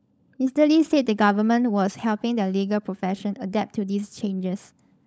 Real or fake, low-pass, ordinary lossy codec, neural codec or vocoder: fake; none; none; codec, 16 kHz, 16 kbps, FunCodec, trained on LibriTTS, 50 frames a second